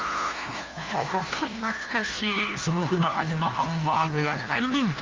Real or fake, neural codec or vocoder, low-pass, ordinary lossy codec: fake; codec, 16 kHz, 1 kbps, FunCodec, trained on LibriTTS, 50 frames a second; 7.2 kHz; Opus, 32 kbps